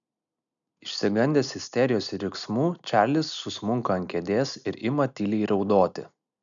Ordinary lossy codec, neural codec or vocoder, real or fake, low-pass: MP3, 96 kbps; none; real; 7.2 kHz